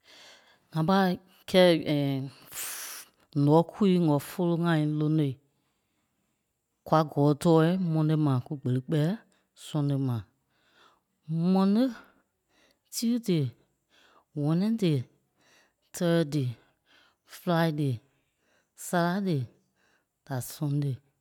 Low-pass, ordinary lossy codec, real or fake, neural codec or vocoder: 19.8 kHz; none; real; none